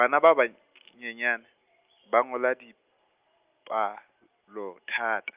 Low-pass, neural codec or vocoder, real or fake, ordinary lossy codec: 3.6 kHz; none; real; Opus, 24 kbps